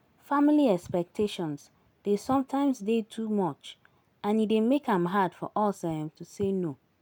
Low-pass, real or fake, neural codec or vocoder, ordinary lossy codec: none; real; none; none